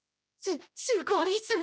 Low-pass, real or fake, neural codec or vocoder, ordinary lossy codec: none; fake; codec, 16 kHz, 1 kbps, X-Codec, HuBERT features, trained on general audio; none